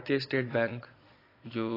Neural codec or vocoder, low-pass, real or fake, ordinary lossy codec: vocoder, 22.05 kHz, 80 mel bands, Vocos; 5.4 kHz; fake; AAC, 24 kbps